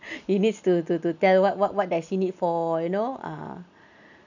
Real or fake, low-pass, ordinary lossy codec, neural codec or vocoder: real; 7.2 kHz; none; none